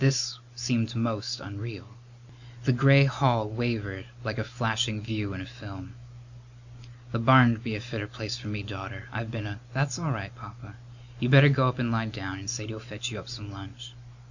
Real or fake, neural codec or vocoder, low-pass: real; none; 7.2 kHz